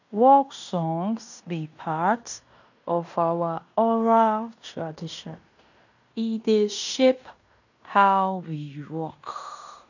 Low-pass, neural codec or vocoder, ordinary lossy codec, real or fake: 7.2 kHz; codec, 16 kHz in and 24 kHz out, 0.9 kbps, LongCat-Audio-Codec, fine tuned four codebook decoder; none; fake